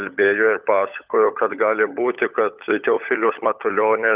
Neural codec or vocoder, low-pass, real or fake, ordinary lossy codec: codec, 16 kHz, 16 kbps, FunCodec, trained on LibriTTS, 50 frames a second; 3.6 kHz; fake; Opus, 24 kbps